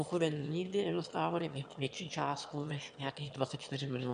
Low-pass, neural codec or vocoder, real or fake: 9.9 kHz; autoencoder, 22.05 kHz, a latent of 192 numbers a frame, VITS, trained on one speaker; fake